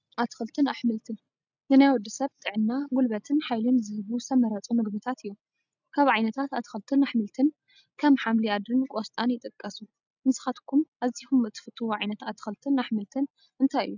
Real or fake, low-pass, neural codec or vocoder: real; 7.2 kHz; none